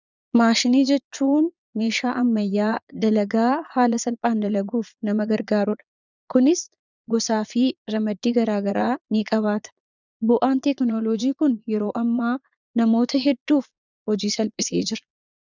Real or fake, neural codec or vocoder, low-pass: fake; vocoder, 22.05 kHz, 80 mel bands, Vocos; 7.2 kHz